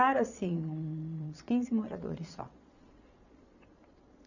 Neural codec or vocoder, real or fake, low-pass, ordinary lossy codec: vocoder, 22.05 kHz, 80 mel bands, Vocos; fake; 7.2 kHz; none